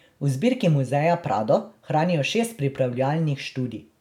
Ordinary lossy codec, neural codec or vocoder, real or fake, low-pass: none; none; real; 19.8 kHz